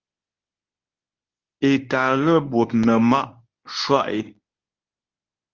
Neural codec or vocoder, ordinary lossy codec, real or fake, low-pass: codec, 24 kHz, 0.9 kbps, WavTokenizer, medium speech release version 1; Opus, 32 kbps; fake; 7.2 kHz